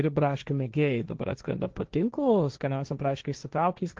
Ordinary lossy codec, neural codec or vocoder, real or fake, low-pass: Opus, 16 kbps; codec, 16 kHz, 1.1 kbps, Voila-Tokenizer; fake; 7.2 kHz